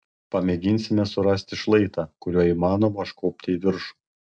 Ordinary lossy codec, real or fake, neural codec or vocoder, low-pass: MP3, 96 kbps; real; none; 9.9 kHz